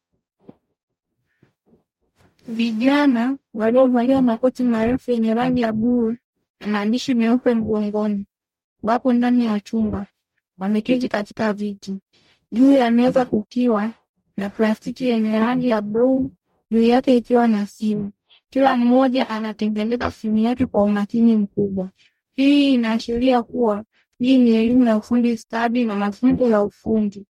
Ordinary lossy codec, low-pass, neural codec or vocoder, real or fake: MP3, 64 kbps; 19.8 kHz; codec, 44.1 kHz, 0.9 kbps, DAC; fake